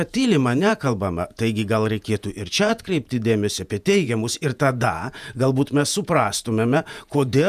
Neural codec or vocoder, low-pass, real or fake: vocoder, 48 kHz, 128 mel bands, Vocos; 14.4 kHz; fake